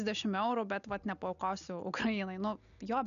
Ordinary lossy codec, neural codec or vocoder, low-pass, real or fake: MP3, 96 kbps; none; 7.2 kHz; real